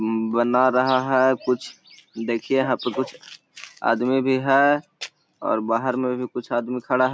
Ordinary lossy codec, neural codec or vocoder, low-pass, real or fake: none; none; none; real